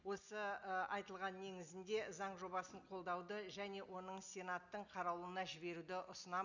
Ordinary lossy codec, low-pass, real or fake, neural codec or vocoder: none; 7.2 kHz; real; none